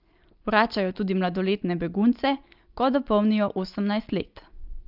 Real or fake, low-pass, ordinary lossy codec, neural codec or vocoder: real; 5.4 kHz; Opus, 32 kbps; none